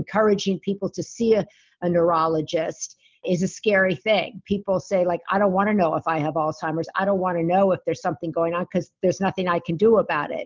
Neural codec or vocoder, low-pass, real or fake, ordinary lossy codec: none; 7.2 kHz; real; Opus, 24 kbps